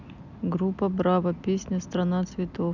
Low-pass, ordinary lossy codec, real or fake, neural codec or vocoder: 7.2 kHz; none; real; none